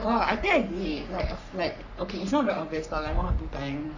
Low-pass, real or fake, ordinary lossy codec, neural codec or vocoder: 7.2 kHz; fake; none; codec, 44.1 kHz, 3.4 kbps, Pupu-Codec